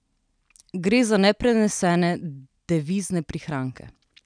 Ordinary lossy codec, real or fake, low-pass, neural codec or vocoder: none; real; 9.9 kHz; none